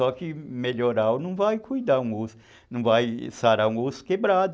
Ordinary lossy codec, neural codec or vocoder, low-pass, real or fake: none; none; none; real